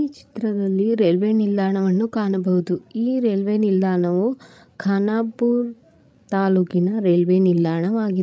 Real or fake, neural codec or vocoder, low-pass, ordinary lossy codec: fake; codec, 16 kHz, 16 kbps, FreqCodec, larger model; none; none